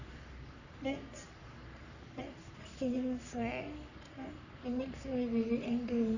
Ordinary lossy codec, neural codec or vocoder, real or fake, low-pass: none; codec, 44.1 kHz, 3.4 kbps, Pupu-Codec; fake; 7.2 kHz